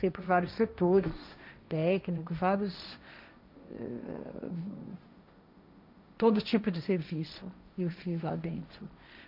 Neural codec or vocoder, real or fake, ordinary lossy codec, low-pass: codec, 16 kHz, 1.1 kbps, Voila-Tokenizer; fake; none; 5.4 kHz